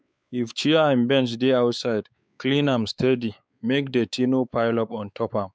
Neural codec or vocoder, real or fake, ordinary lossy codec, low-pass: codec, 16 kHz, 4 kbps, X-Codec, WavLM features, trained on Multilingual LibriSpeech; fake; none; none